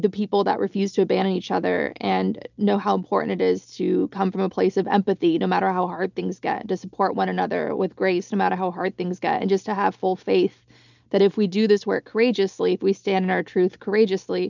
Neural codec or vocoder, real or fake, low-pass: none; real; 7.2 kHz